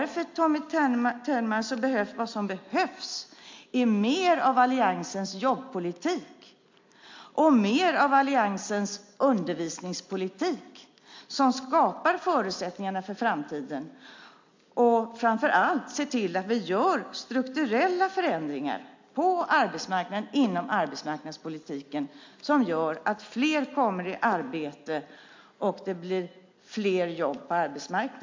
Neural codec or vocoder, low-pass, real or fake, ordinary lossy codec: none; 7.2 kHz; real; MP3, 48 kbps